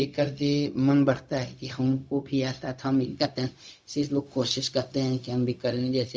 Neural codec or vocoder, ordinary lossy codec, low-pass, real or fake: codec, 16 kHz, 0.4 kbps, LongCat-Audio-Codec; none; none; fake